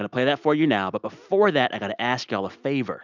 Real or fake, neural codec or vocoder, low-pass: real; none; 7.2 kHz